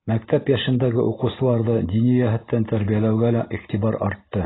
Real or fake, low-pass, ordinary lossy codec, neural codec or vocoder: real; 7.2 kHz; AAC, 16 kbps; none